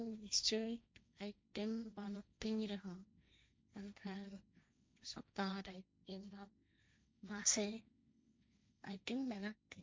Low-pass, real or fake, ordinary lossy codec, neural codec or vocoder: none; fake; none; codec, 16 kHz, 1.1 kbps, Voila-Tokenizer